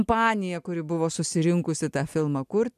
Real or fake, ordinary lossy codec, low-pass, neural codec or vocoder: real; AAC, 96 kbps; 14.4 kHz; none